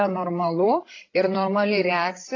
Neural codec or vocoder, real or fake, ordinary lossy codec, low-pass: codec, 16 kHz, 16 kbps, FreqCodec, larger model; fake; AAC, 32 kbps; 7.2 kHz